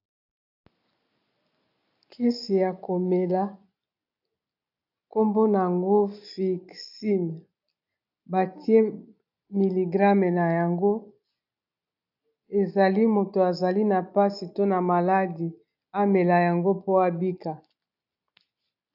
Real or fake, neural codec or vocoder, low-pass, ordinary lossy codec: real; none; 5.4 kHz; AAC, 48 kbps